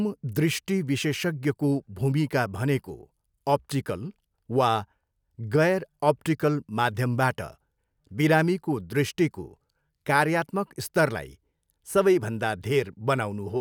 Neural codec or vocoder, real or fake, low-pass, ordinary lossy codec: none; real; none; none